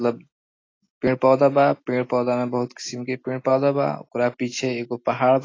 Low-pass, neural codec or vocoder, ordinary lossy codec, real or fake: 7.2 kHz; none; AAC, 32 kbps; real